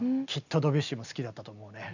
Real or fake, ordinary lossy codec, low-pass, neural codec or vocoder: real; none; 7.2 kHz; none